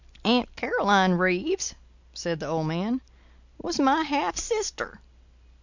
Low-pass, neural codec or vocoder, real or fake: 7.2 kHz; none; real